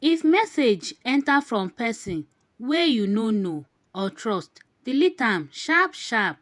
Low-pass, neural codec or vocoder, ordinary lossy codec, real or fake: 10.8 kHz; vocoder, 48 kHz, 128 mel bands, Vocos; none; fake